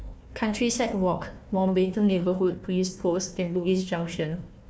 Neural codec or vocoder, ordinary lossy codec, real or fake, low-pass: codec, 16 kHz, 1 kbps, FunCodec, trained on Chinese and English, 50 frames a second; none; fake; none